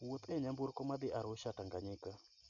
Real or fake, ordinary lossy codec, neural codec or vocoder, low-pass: real; none; none; 7.2 kHz